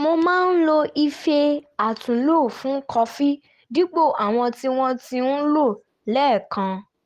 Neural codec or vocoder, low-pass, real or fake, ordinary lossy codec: none; 14.4 kHz; real; Opus, 24 kbps